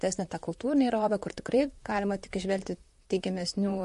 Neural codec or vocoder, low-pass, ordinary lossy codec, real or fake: vocoder, 44.1 kHz, 128 mel bands, Pupu-Vocoder; 14.4 kHz; MP3, 48 kbps; fake